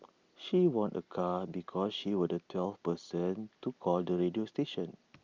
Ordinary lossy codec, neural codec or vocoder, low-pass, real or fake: Opus, 24 kbps; none; 7.2 kHz; real